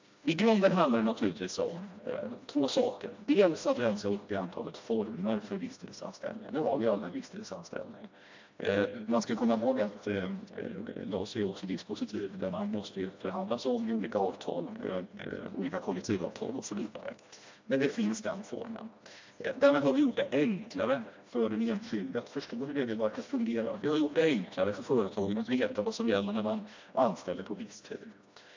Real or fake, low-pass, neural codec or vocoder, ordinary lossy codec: fake; 7.2 kHz; codec, 16 kHz, 1 kbps, FreqCodec, smaller model; MP3, 48 kbps